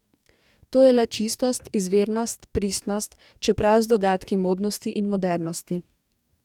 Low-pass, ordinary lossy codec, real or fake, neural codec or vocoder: 19.8 kHz; none; fake; codec, 44.1 kHz, 2.6 kbps, DAC